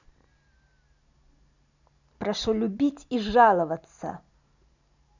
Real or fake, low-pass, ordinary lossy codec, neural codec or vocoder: real; 7.2 kHz; none; none